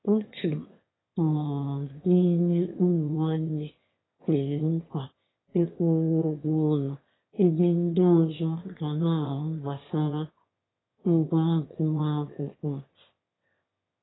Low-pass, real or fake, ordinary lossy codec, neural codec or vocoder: 7.2 kHz; fake; AAC, 16 kbps; autoencoder, 22.05 kHz, a latent of 192 numbers a frame, VITS, trained on one speaker